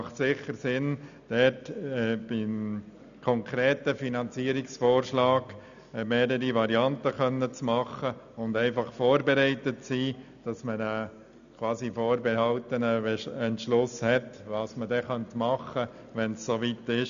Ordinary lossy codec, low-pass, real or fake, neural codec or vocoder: none; 7.2 kHz; real; none